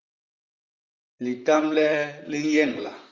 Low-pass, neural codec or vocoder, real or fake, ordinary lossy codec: 7.2 kHz; vocoder, 44.1 kHz, 128 mel bands, Pupu-Vocoder; fake; Opus, 32 kbps